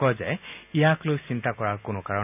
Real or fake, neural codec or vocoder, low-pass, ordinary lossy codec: real; none; 3.6 kHz; none